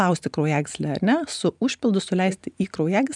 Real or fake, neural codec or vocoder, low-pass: real; none; 10.8 kHz